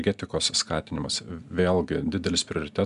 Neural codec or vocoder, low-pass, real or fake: none; 10.8 kHz; real